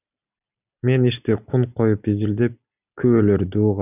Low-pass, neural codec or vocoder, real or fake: 3.6 kHz; none; real